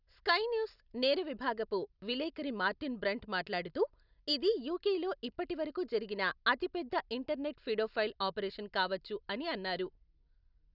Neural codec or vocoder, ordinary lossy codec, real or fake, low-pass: none; none; real; 5.4 kHz